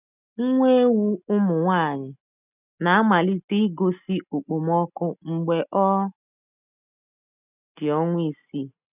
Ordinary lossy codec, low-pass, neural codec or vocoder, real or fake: none; 3.6 kHz; none; real